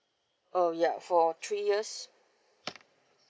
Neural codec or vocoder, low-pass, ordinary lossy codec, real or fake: none; none; none; real